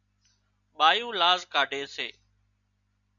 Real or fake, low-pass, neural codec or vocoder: real; 7.2 kHz; none